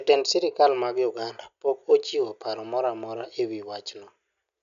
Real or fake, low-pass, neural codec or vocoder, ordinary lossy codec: real; 7.2 kHz; none; none